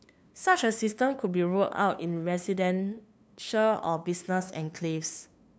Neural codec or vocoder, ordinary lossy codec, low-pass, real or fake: codec, 16 kHz, 2 kbps, FunCodec, trained on LibriTTS, 25 frames a second; none; none; fake